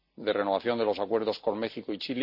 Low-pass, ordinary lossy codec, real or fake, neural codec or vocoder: 5.4 kHz; none; real; none